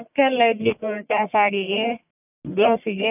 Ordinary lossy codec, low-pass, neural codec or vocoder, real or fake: none; 3.6 kHz; codec, 44.1 kHz, 1.7 kbps, Pupu-Codec; fake